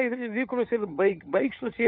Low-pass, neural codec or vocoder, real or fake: 5.4 kHz; codec, 16 kHz, 4 kbps, FunCodec, trained on LibriTTS, 50 frames a second; fake